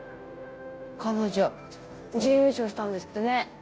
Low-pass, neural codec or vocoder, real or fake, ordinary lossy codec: none; codec, 16 kHz, 0.5 kbps, FunCodec, trained on Chinese and English, 25 frames a second; fake; none